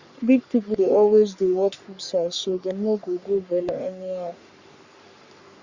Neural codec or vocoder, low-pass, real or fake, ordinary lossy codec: codec, 44.1 kHz, 3.4 kbps, Pupu-Codec; 7.2 kHz; fake; Opus, 64 kbps